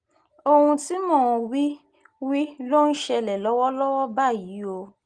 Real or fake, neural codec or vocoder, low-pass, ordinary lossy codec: real; none; 9.9 kHz; Opus, 24 kbps